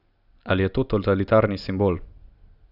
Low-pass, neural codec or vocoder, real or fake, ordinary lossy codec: 5.4 kHz; none; real; none